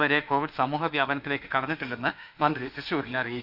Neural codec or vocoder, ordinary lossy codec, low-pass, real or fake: autoencoder, 48 kHz, 32 numbers a frame, DAC-VAE, trained on Japanese speech; none; 5.4 kHz; fake